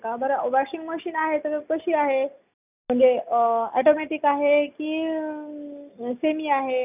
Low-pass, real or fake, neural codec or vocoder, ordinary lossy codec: 3.6 kHz; real; none; none